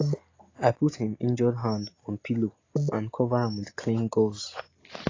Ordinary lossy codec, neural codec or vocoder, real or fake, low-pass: AAC, 32 kbps; none; real; 7.2 kHz